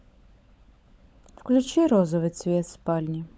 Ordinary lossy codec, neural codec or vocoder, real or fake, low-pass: none; codec, 16 kHz, 16 kbps, FunCodec, trained on LibriTTS, 50 frames a second; fake; none